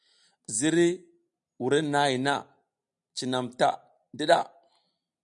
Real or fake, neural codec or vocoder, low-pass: real; none; 10.8 kHz